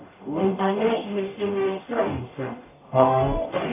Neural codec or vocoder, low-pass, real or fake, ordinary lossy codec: codec, 44.1 kHz, 0.9 kbps, DAC; 3.6 kHz; fake; AAC, 24 kbps